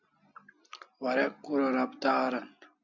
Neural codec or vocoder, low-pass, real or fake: none; 7.2 kHz; real